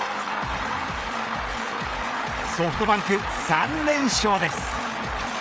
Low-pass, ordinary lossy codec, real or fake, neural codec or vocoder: none; none; fake; codec, 16 kHz, 8 kbps, FreqCodec, larger model